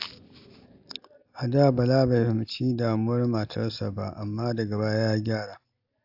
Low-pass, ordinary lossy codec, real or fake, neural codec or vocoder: 5.4 kHz; AAC, 48 kbps; real; none